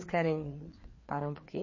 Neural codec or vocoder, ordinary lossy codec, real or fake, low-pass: codec, 16 kHz, 4 kbps, FreqCodec, larger model; MP3, 32 kbps; fake; 7.2 kHz